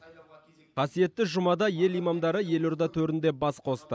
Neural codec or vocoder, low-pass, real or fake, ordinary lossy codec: none; none; real; none